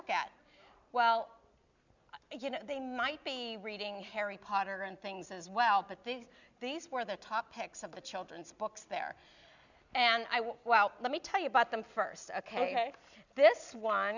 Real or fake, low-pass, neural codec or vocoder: real; 7.2 kHz; none